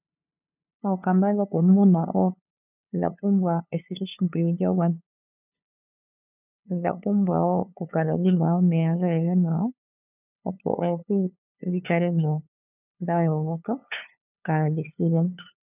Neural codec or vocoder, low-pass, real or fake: codec, 16 kHz, 2 kbps, FunCodec, trained on LibriTTS, 25 frames a second; 3.6 kHz; fake